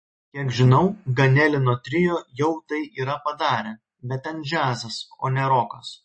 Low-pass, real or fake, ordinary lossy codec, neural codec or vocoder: 9.9 kHz; real; MP3, 32 kbps; none